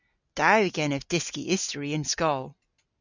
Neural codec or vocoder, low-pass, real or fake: none; 7.2 kHz; real